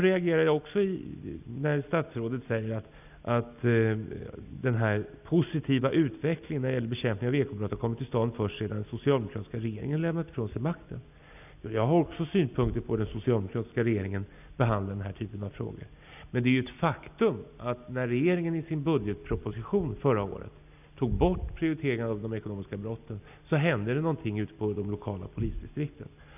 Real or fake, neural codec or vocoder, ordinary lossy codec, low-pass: real; none; none; 3.6 kHz